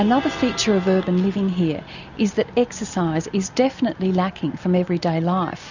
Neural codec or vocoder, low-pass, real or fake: none; 7.2 kHz; real